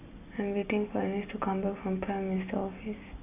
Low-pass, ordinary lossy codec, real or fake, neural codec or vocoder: 3.6 kHz; none; real; none